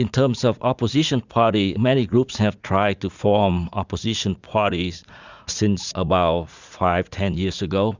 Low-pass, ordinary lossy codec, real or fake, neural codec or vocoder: 7.2 kHz; Opus, 64 kbps; fake; vocoder, 44.1 kHz, 80 mel bands, Vocos